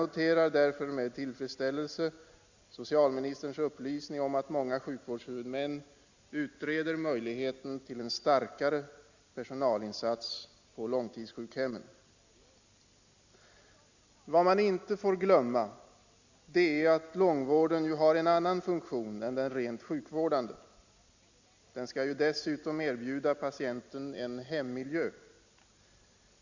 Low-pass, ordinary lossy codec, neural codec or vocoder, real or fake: 7.2 kHz; Opus, 64 kbps; none; real